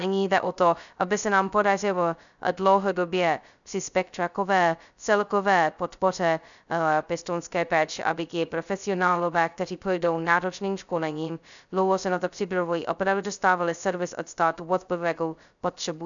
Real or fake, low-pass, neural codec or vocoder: fake; 7.2 kHz; codec, 16 kHz, 0.2 kbps, FocalCodec